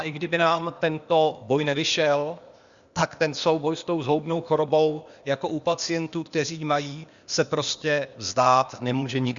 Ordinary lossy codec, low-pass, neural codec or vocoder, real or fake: Opus, 64 kbps; 7.2 kHz; codec, 16 kHz, 0.8 kbps, ZipCodec; fake